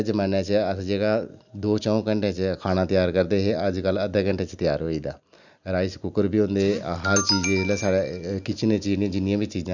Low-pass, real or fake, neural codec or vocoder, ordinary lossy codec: 7.2 kHz; real; none; none